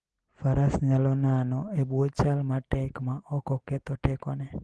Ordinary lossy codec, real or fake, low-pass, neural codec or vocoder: Opus, 16 kbps; real; 10.8 kHz; none